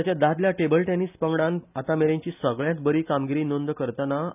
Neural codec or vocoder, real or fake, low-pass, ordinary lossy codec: none; real; 3.6 kHz; none